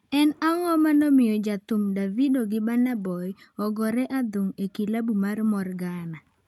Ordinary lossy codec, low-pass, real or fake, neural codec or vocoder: none; 19.8 kHz; real; none